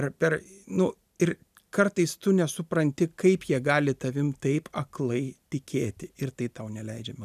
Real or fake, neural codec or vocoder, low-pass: real; none; 14.4 kHz